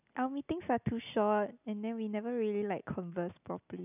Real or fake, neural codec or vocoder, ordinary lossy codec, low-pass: real; none; none; 3.6 kHz